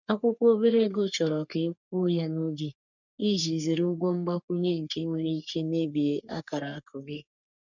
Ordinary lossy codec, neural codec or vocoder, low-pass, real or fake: none; codec, 44.1 kHz, 3.4 kbps, Pupu-Codec; 7.2 kHz; fake